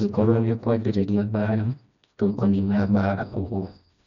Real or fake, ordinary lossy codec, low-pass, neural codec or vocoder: fake; none; 7.2 kHz; codec, 16 kHz, 1 kbps, FreqCodec, smaller model